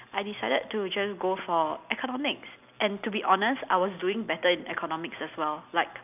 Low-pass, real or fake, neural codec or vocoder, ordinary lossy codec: 3.6 kHz; real; none; none